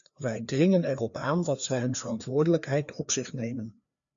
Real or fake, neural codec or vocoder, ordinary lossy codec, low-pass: fake; codec, 16 kHz, 2 kbps, FreqCodec, larger model; AAC, 64 kbps; 7.2 kHz